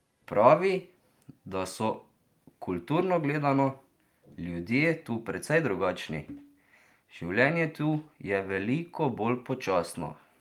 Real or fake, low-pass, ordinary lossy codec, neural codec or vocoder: real; 19.8 kHz; Opus, 32 kbps; none